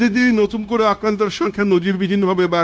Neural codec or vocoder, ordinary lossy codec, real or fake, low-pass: codec, 16 kHz, 0.9 kbps, LongCat-Audio-Codec; none; fake; none